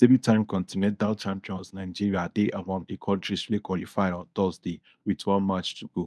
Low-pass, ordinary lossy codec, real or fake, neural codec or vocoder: none; none; fake; codec, 24 kHz, 0.9 kbps, WavTokenizer, medium speech release version 1